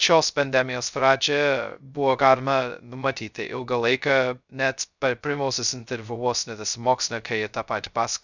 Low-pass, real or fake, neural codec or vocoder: 7.2 kHz; fake; codec, 16 kHz, 0.2 kbps, FocalCodec